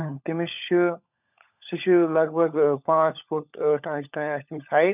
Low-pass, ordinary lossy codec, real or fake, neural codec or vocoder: 3.6 kHz; none; fake; codec, 16 kHz, 16 kbps, FunCodec, trained on LibriTTS, 50 frames a second